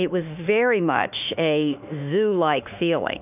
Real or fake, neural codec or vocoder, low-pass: fake; autoencoder, 48 kHz, 32 numbers a frame, DAC-VAE, trained on Japanese speech; 3.6 kHz